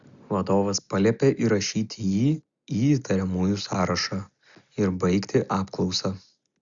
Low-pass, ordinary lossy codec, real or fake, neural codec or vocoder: 7.2 kHz; Opus, 64 kbps; real; none